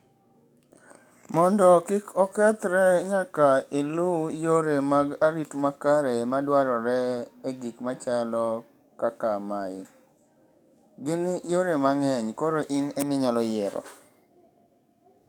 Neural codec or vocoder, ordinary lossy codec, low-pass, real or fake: codec, 44.1 kHz, 7.8 kbps, DAC; none; 19.8 kHz; fake